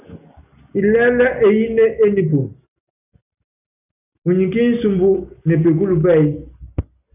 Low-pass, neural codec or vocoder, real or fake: 3.6 kHz; none; real